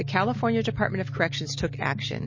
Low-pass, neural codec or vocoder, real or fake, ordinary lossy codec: 7.2 kHz; none; real; MP3, 32 kbps